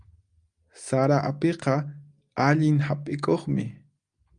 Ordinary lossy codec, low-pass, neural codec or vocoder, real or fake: Opus, 32 kbps; 9.9 kHz; none; real